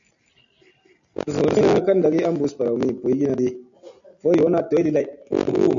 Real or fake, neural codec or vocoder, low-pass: real; none; 7.2 kHz